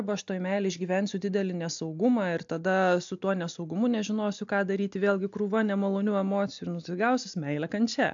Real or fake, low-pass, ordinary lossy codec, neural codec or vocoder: real; 7.2 kHz; AAC, 64 kbps; none